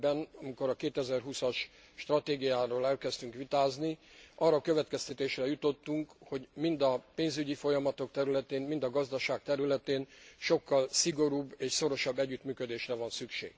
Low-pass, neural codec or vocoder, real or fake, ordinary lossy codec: none; none; real; none